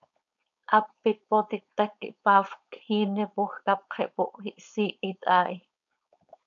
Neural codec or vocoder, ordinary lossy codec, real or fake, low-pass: codec, 16 kHz, 4.8 kbps, FACodec; MP3, 96 kbps; fake; 7.2 kHz